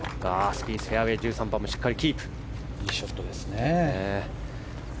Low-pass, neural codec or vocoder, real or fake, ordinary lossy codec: none; none; real; none